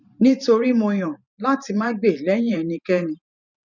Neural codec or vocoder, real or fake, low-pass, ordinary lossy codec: vocoder, 44.1 kHz, 128 mel bands every 512 samples, BigVGAN v2; fake; 7.2 kHz; none